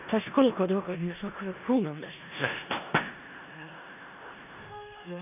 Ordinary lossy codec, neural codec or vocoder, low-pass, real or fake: none; codec, 16 kHz in and 24 kHz out, 0.4 kbps, LongCat-Audio-Codec, four codebook decoder; 3.6 kHz; fake